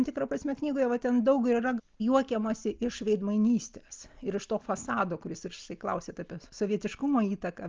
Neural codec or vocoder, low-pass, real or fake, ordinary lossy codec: none; 7.2 kHz; real; Opus, 24 kbps